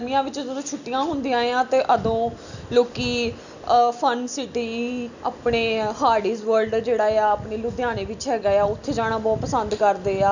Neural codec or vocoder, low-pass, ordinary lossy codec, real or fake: none; 7.2 kHz; none; real